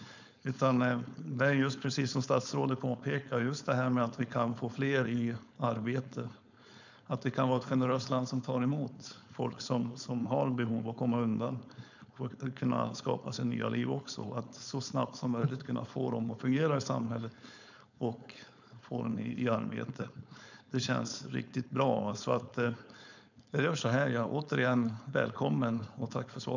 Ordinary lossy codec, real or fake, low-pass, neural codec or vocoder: none; fake; 7.2 kHz; codec, 16 kHz, 4.8 kbps, FACodec